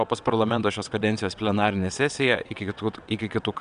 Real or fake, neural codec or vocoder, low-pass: fake; vocoder, 22.05 kHz, 80 mel bands, WaveNeXt; 9.9 kHz